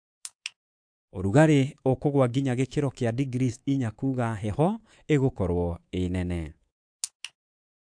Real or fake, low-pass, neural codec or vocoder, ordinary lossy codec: fake; 9.9 kHz; codec, 24 kHz, 3.1 kbps, DualCodec; AAC, 64 kbps